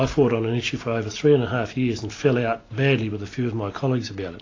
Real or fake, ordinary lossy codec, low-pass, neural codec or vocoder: real; AAC, 32 kbps; 7.2 kHz; none